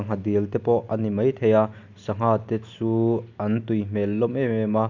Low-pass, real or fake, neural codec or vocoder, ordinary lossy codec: 7.2 kHz; real; none; none